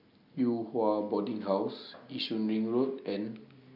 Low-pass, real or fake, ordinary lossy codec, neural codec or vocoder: 5.4 kHz; real; none; none